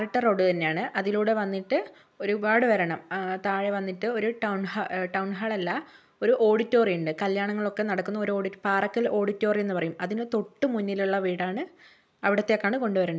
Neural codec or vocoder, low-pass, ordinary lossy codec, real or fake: none; none; none; real